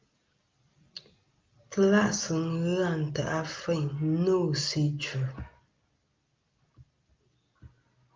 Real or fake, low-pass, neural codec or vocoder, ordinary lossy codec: real; 7.2 kHz; none; Opus, 32 kbps